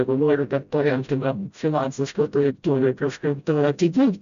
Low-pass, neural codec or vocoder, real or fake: 7.2 kHz; codec, 16 kHz, 0.5 kbps, FreqCodec, smaller model; fake